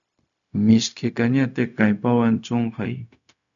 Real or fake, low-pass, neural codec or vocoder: fake; 7.2 kHz; codec, 16 kHz, 0.4 kbps, LongCat-Audio-Codec